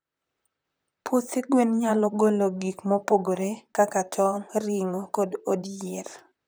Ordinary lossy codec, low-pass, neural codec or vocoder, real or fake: none; none; vocoder, 44.1 kHz, 128 mel bands, Pupu-Vocoder; fake